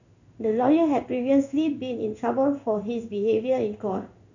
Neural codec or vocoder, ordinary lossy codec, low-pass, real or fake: codec, 16 kHz in and 24 kHz out, 1 kbps, XY-Tokenizer; none; 7.2 kHz; fake